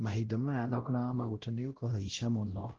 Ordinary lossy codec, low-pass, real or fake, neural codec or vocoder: Opus, 16 kbps; 7.2 kHz; fake; codec, 16 kHz, 0.5 kbps, X-Codec, WavLM features, trained on Multilingual LibriSpeech